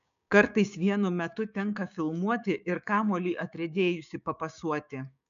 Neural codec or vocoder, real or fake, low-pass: codec, 16 kHz, 6 kbps, DAC; fake; 7.2 kHz